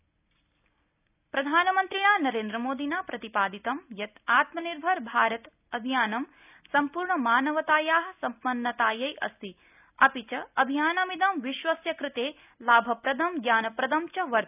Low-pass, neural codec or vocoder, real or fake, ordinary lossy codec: 3.6 kHz; none; real; none